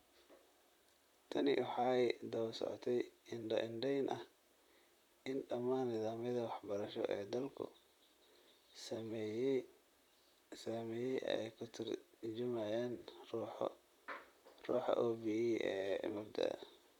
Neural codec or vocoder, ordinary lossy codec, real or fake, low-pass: vocoder, 44.1 kHz, 128 mel bands, Pupu-Vocoder; none; fake; 19.8 kHz